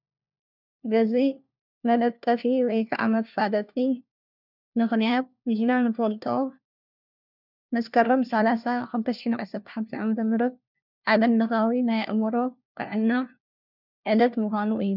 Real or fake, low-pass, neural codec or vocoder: fake; 5.4 kHz; codec, 16 kHz, 1 kbps, FunCodec, trained on LibriTTS, 50 frames a second